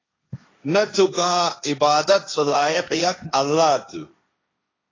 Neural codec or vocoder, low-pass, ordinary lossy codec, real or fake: codec, 16 kHz, 1.1 kbps, Voila-Tokenizer; 7.2 kHz; AAC, 32 kbps; fake